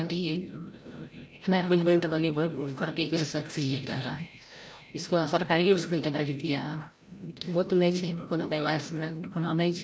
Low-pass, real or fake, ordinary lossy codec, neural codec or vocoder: none; fake; none; codec, 16 kHz, 0.5 kbps, FreqCodec, larger model